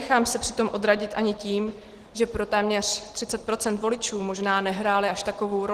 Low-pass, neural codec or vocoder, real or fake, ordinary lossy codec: 14.4 kHz; none; real; Opus, 16 kbps